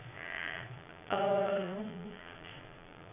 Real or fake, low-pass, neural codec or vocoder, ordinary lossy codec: fake; 3.6 kHz; vocoder, 22.05 kHz, 80 mel bands, Vocos; none